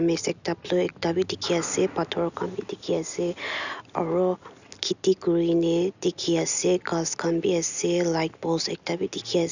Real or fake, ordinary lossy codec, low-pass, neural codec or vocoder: real; none; 7.2 kHz; none